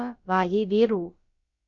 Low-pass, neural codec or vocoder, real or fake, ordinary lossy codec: 7.2 kHz; codec, 16 kHz, about 1 kbps, DyCAST, with the encoder's durations; fake; Opus, 64 kbps